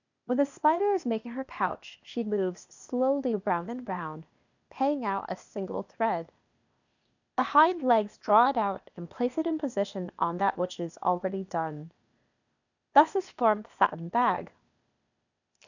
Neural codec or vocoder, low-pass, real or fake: codec, 16 kHz, 0.8 kbps, ZipCodec; 7.2 kHz; fake